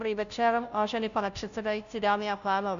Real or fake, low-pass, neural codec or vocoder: fake; 7.2 kHz; codec, 16 kHz, 0.5 kbps, FunCodec, trained on Chinese and English, 25 frames a second